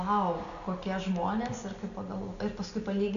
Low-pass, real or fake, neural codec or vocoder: 7.2 kHz; real; none